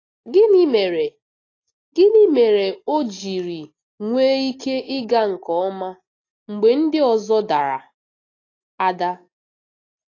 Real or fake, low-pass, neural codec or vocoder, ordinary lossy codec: real; 7.2 kHz; none; AAC, 32 kbps